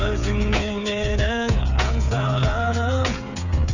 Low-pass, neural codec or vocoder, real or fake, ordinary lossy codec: 7.2 kHz; codec, 16 kHz, 8 kbps, FreqCodec, smaller model; fake; none